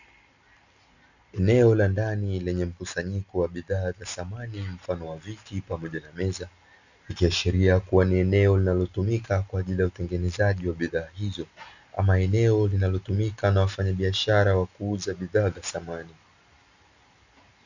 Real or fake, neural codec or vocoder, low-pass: real; none; 7.2 kHz